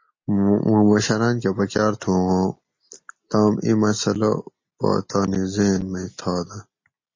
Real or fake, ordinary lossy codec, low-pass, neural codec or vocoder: real; MP3, 32 kbps; 7.2 kHz; none